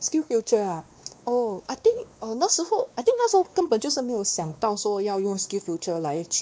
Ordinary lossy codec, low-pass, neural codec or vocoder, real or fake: none; none; codec, 16 kHz, 2 kbps, X-Codec, WavLM features, trained on Multilingual LibriSpeech; fake